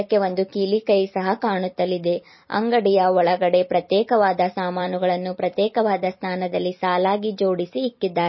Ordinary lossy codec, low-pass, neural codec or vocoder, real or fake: MP3, 24 kbps; 7.2 kHz; vocoder, 44.1 kHz, 128 mel bands every 256 samples, BigVGAN v2; fake